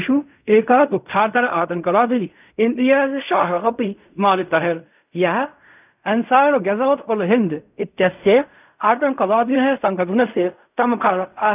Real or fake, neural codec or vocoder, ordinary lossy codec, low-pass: fake; codec, 16 kHz in and 24 kHz out, 0.4 kbps, LongCat-Audio-Codec, fine tuned four codebook decoder; none; 3.6 kHz